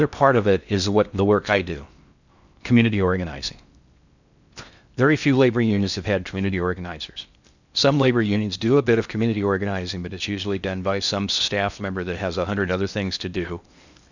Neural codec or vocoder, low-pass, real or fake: codec, 16 kHz in and 24 kHz out, 0.6 kbps, FocalCodec, streaming, 4096 codes; 7.2 kHz; fake